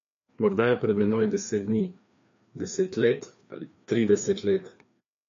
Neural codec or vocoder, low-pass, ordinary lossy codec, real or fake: codec, 16 kHz, 2 kbps, FreqCodec, larger model; 7.2 kHz; MP3, 48 kbps; fake